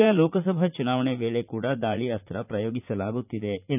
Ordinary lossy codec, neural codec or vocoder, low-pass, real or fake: none; vocoder, 44.1 kHz, 80 mel bands, Vocos; 3.6 kHz; fake